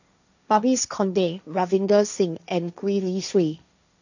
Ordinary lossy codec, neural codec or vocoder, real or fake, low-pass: none; codec, 16 kHz, 1.1 kbps, Voila-Tokenizer; fake; 7.2 kHz